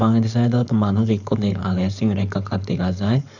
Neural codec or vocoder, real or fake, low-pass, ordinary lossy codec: codec, 16 kHz, 4.8 kbps, FACodec; fake; 7.2 kHz; none